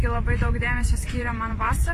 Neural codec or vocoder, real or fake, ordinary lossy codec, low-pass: vocoder, 44.1 kHz, 128 mel bands every 512 samples, BigVGAN v2; fake; AAC, 48 kbps; 14.4 kHz